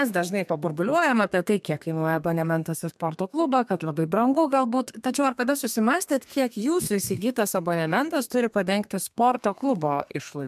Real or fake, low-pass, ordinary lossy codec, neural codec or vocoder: fake; 14.4 kHz; MP3, 96 kbps; codec, 32 kHz, 1.9 kbps, SNAC